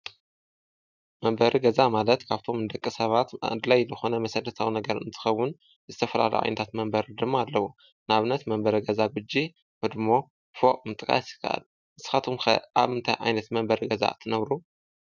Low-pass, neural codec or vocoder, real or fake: 7.2 kHz; none; real